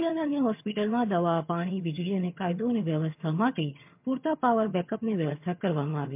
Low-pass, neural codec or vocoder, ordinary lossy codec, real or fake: 3.6 kHz; vocoder, 22.05 kHz, 80 mel bands, HiFi-GAN; MP3, 32 kbps; fake